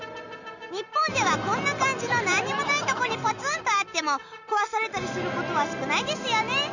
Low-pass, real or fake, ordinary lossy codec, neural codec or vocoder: 7.2 kHz; real; none; none